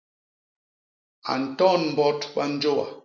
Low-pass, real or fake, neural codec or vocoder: 7.2 kHz; real; none